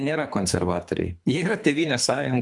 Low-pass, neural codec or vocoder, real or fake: 10.8 kHz; codec, 24 kHz, 3 kbps, HILCodec; fake